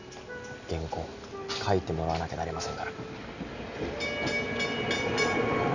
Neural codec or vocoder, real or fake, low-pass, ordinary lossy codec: none; real; 7.2 kHz; none